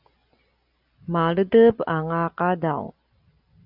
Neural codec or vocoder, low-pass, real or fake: none; 5.4 kHz; real